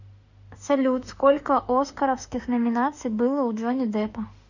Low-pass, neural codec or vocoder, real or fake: 7.2 kHz; autoencoder, 48 kHz, 32 numbers a frame, DAC-VAE, trained on Japanese speech; fake